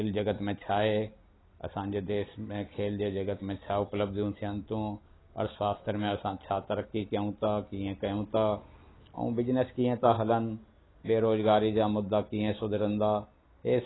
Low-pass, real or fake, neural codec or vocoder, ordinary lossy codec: 7.2 kHz; real; none; AAC, 16 kbps